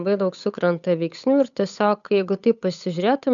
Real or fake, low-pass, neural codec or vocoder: fake; 7.2 kHz; codec, 16 kHz, 8 kbps, FunCodec, trained on Chinese and English, 25 frames a second